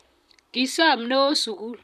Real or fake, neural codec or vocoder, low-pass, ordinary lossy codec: fake; vocoder, 44.1 kHz, 128 mel bands, Pupu-Vocoder; 14.4 kHz; none